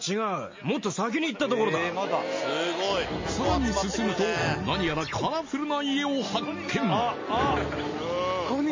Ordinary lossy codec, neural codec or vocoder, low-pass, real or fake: MP3, 32 kbps; none; 7.2 kHz; real